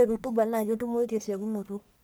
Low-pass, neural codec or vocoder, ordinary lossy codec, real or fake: none; codec, 44.1 kHz, 1.7 kbps, Pupu-Codec; none; fake